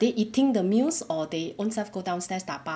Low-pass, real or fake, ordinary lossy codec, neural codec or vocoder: none; real; none; none